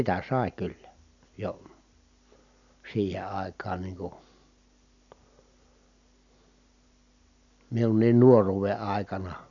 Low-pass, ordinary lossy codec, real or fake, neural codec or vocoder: 7.2 kHz; none; real; none